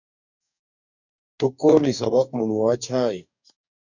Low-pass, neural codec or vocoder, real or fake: 7.2 kHz; codec, 44.1 kHz, 2.6 kbps, DAC; fake